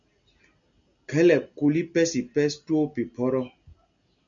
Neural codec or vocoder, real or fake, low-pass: none; real; 7.2 kHz